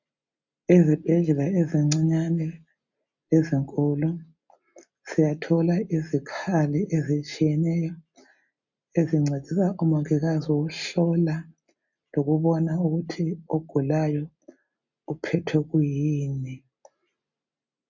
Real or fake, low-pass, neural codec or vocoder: real; 7.2 kHz; none